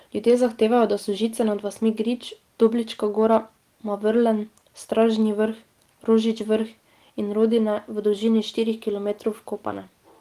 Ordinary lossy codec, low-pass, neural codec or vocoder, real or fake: Opus, 24 kbps; 14.4 kHz; none; real